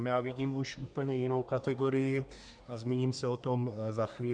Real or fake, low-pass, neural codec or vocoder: fake; 9.9 kHz; codec, 24 kHz, 1 kbps, SNAC